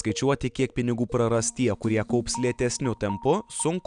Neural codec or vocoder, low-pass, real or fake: none; 9.9 kHz; real